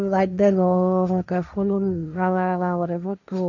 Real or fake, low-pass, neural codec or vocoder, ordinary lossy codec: fake; 7.2 kHz; codec, 16 kHz, 1.1 kbps, Voila-Tokenizer; none